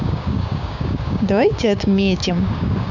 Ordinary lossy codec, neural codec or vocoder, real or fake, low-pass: none; codec, 16 kHz, 6 kbps, DAC; fake; 7.2 kHz